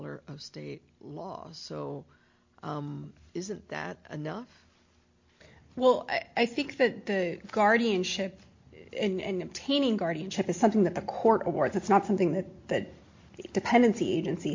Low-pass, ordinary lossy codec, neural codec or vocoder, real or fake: 7.2 kHz; MP3, 48 kbps; none; real